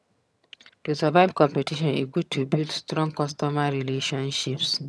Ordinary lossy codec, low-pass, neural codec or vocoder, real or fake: none; none; vocoder, 22.05 kHz, 80 mel bands, HiFi-GAN; fake